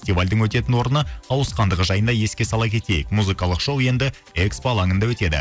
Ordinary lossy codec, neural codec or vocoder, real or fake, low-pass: none; none; real; none